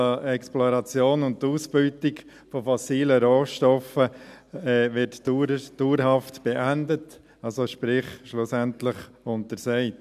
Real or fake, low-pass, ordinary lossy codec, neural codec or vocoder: real; 14.4 kHz; none; none